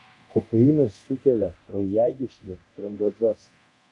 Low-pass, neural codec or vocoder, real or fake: 10.8 kHz; codec, 24 kHz, 0.9 kbps, DualCodec; fake